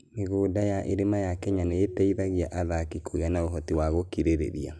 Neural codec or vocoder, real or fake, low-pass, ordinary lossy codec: none; real; none; none